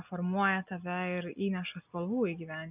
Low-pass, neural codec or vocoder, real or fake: 3.6 kHz; none; real